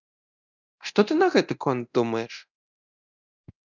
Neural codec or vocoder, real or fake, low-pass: codec, 24 kHz, 0.9 kbps, DualCodec; fake; 7.2 kHz